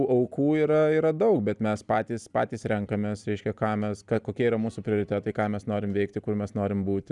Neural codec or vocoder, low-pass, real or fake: none; 10.8 kHz; real